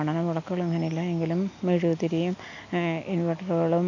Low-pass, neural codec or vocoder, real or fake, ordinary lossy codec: 7.2 kHz; none; real; none